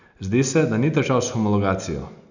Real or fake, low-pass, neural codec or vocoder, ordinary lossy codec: real; 7.2 kHz; none; none